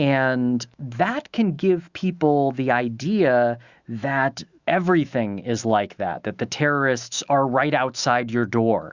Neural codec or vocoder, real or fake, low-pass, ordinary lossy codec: autoencoder, 48 kHz, 128 numbers a frame, DAC-VAE, trained on Japanese speech; fake; 7.2 kHz; Opus, 64 kbps